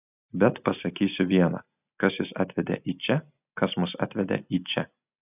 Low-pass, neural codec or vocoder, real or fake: 3.6 kHz; none; real